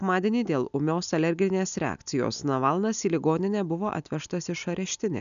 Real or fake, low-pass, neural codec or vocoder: real; 7.2 kHz; none